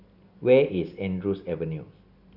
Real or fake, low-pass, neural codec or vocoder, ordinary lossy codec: real; 5.4 kHz; none; none